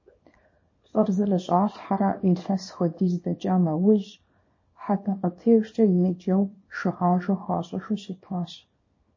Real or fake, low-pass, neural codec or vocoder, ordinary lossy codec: fake; 7.2 kHz; codec, 24 kHz, 0.9 kbps, WavTokenizer, small release; MP3, 32 kbps